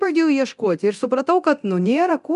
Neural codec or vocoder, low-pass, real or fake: codec, 24 kHz, 0.9 kbps, DualCodec; 10.8 kHz; fake